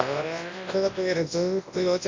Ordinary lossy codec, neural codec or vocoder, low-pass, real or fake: MP3, 48 kbps; codec, 24 kHz, 0.9 kbps, WavTokenizer, large speech release; 7.2 kHz; fake